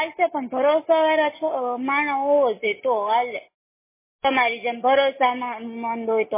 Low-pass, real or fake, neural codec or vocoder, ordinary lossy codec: 3.6 kHz; real; none; MP3, 16 kbps